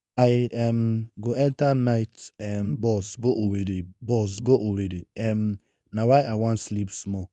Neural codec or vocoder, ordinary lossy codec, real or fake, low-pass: codec, 24 kHz, 0.9 kbps, WavTokenizer, medium speech release version 2; none; fake; 10.8 kHz